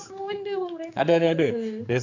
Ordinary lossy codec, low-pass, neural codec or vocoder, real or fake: AAC, 48 kbps; 7.2 kHz; codec, 16 kHz, 4 kbps, X-Codec, HuBERT features, trained on general audio; fake